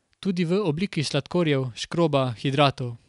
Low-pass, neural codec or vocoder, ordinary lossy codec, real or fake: 10.8 kHz; none; none; real